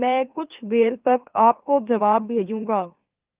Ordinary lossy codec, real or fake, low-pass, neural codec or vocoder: Opus, 24 kbps; fake; 3.6 kHz; autoencoder, 44.1 kHz, a latent of 192 numbers a frame, MeloTTS